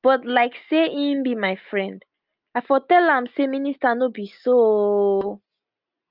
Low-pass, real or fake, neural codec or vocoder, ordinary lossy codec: 5.4 kHz; real; none; Opus, 24 kbps